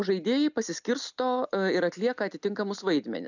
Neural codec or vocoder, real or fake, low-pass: none; real; 7.2 kHz